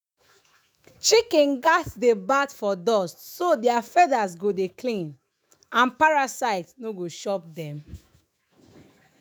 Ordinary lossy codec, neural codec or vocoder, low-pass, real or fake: none; autoencoder, 48 kHz, 128 numbers a frame, DAC-VAE, trained on Japanese speech; none; fake